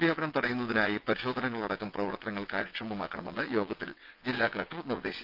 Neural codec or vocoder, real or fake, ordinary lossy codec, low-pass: vocoder, 22.05 kHz, 80 mel bands, WaveNeXt; fake; Opus, 24 kbps; 5.4 kHz